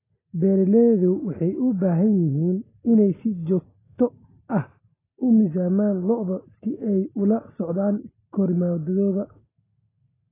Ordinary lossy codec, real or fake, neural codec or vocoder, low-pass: AAC, 16 kbps; real; none; 3.6 kHz